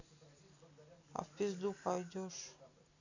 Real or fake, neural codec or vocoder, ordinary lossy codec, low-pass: real; none; none; 7.2 kHz